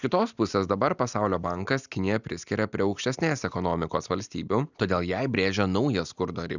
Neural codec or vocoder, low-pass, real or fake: none; 7.2 kHz; real